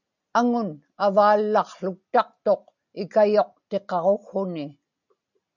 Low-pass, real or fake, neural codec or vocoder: 7.2 kHz; real; none